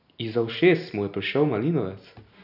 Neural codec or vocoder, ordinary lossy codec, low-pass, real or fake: none; none; 5.4 kHz; real